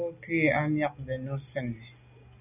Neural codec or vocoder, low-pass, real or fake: none; 3.6 kHz; real